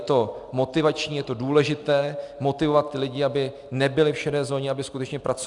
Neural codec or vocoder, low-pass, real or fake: none; 10.8 kHz; real